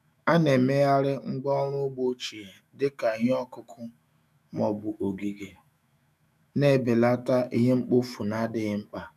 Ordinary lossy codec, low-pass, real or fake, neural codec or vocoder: none; 14.4 kHz; fake; autoencoder, 48 kHz, 128 numbers a frame, DAC-VAE, trained on Japanese speech